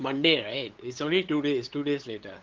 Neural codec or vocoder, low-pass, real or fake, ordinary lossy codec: codec, 16 kHz, 8 kbps, FunCodec, trained on LibriTTS, 25 frames a second; 7.2 kHz; fake; Opus, 16 kbps